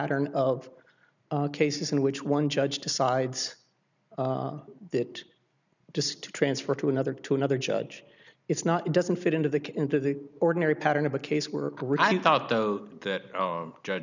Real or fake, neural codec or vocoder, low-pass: real; none; 7.2 kHz